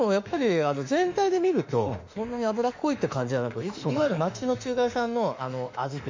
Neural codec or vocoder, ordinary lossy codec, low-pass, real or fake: autoencoder, 48 kHz, 32 numbers a frame, DAC-VAE, trained on Japanese speech; MP3, 48 kbps; 7.2 kHz; fake